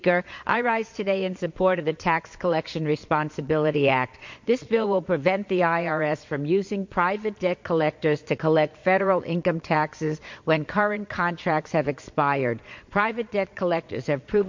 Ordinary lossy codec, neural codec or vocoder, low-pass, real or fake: MP3, 48 kbps; vocoder, 22.05 kHz, 80 mel bands, Vocos; 7.2 kHz; fake